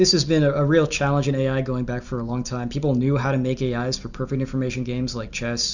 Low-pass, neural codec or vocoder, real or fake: 7.2 kHz; none; real